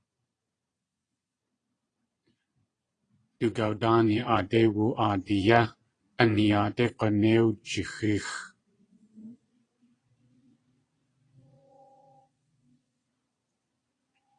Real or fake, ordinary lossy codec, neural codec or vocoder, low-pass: fake; AAC, 32 kbps; vocoder, 22.05 kHz, 80 mel bands, Vocos; 9.9 kHz